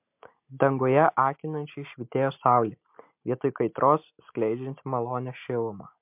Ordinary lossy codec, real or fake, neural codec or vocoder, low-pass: MP3, 32 kbps; real; none; 3.6 kHz